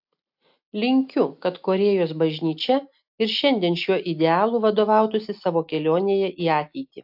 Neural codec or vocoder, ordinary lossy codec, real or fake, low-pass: none; AAC, 48 kbps; real; 5.4 kHz